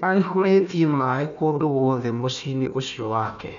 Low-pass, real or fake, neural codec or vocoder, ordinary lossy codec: 7.2 kHz; fake; codec, 16 kHz, 1 kbps, FunCodec, trained on Chinese and English, 50 frames a second; none